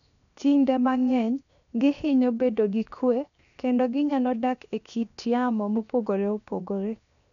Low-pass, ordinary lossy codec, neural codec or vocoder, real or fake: 7.2 kHz; none; codec, 16 kHz, 0.7 kbps, FocalCodec; fake